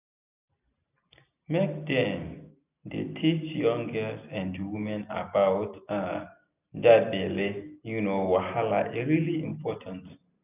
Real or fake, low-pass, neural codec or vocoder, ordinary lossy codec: real; 3.6 kHz; none; none